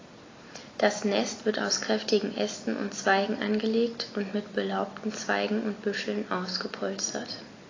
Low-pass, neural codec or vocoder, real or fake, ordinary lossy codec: 7.2 kHz; none; real; AAC, 32 kbps